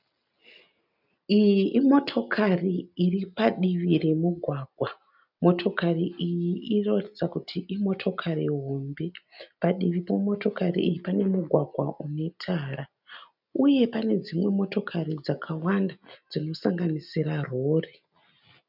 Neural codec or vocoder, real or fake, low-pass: none; real; 5.4 kHz